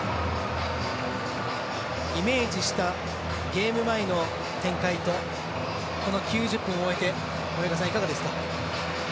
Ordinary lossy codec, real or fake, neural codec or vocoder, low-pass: none; real; none; none